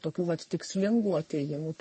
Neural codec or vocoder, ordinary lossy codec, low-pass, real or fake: codec, 44.1 kHz, 3.4 kbps, Pupu-Codec; MP3, 32 kbps; 9.9 kHz; fake